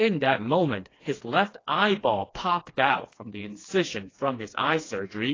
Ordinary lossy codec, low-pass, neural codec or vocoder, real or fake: AAC, 32 kbps; 7.2 kHz; codec, 16 kHz, 2 kbps, FreqCodec, smaller model; fake